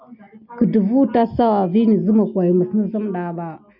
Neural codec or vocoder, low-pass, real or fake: none; 5.4 kHz; real